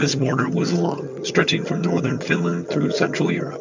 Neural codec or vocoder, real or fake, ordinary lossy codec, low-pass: vocoder, 22.05 kHz, 80 mel bands, HiFi-GAN; fake; MP3, 64 kbps; 7.2 kHz